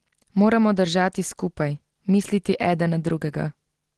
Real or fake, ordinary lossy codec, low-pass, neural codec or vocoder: real; Opus, 16 kbps; 10.8 kHz; none